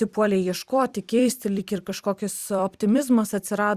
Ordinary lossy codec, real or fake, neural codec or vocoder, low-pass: Opus, 64 kbps; fake; vocoder, 44.1 kHz, 128 mel bands every 256 samples, BigVGAN v2; 14.4 kHz